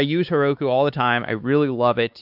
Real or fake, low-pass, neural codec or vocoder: fake; 5.4 kHz; codec, 16 kHz, 4 kbps, X-Codec, WavLM features, trained on Multilingual LibriSpeech